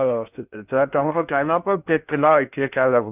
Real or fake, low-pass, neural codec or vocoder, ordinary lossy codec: fake; 3.6 kHz; codec, 16 kHz in and 24 kHz out, 0.6 kbps, FocalCodec, streaming, 2048 codes; none